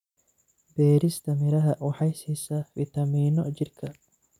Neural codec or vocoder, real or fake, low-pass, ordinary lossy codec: none; real; 19.8 kHz; none